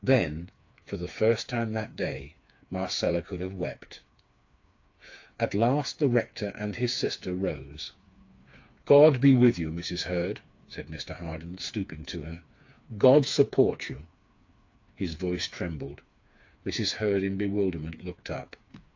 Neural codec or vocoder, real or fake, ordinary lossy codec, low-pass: codec, 16 kHz, 4 kbps, FreqCodec, smaller model; fake; AAC, 48 kbps; 7.2 kHz